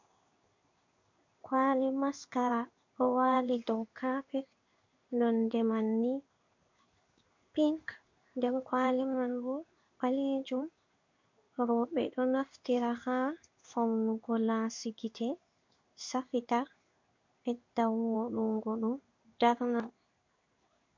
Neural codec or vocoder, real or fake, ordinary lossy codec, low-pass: codec, 16 kHz in and 24 kHz out, 1 kbps, XY-Tokenizer; fake; MP3, 48 kbps; 7.2 kHz